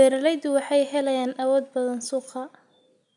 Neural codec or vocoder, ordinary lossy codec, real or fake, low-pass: none; none; real; 10.8 kHz